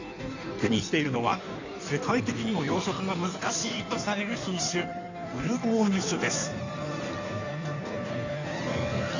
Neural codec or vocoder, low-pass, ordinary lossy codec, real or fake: codec, 16 kHz in and 24 kHz out, 1.1 kbps, FireRedTTS-2 codec; 7.2 kHz; none; fake